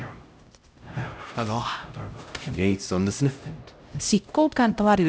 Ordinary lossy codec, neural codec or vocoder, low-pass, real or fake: none; codec, 16 kHz, 0.5 kbps, X-Codec, HuBERT features, trained on LibriSpeech; none; fake